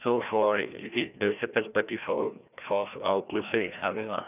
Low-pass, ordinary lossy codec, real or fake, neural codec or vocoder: 3.6 kHz; none; fake; codec, 16 kHz, 1 kbps, FreqCodec, larger model